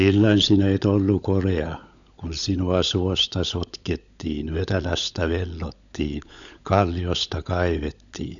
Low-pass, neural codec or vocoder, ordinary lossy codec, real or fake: 7.2 kHz; codec, 16 kHz, 16 kbps, FunCodec, trained on LibriTTS, 50 frames a second; none; fake